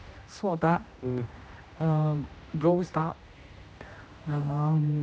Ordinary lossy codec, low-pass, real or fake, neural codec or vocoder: none; none; fake; codec, 16 kHz, 1 kbps, X-Codec, HuBERT features, trained on general audio